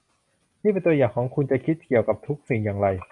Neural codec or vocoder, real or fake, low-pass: none; real; 10.8 kHz